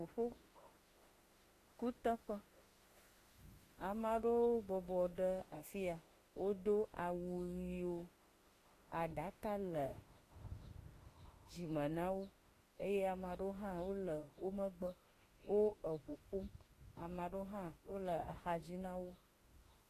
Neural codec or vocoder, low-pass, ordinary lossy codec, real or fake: autoencoder, 48 kHz, 32 numbers a frame, DAC-VAE, trained on Japanese speech; 14.4 kHz; AAC, 48 kbps; fake